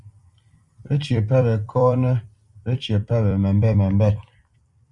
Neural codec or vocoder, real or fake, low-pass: vocoder, 44.1 kHz, 128 mel bands every 256 samples, BigVGAN v2; fake; 10.8 kHz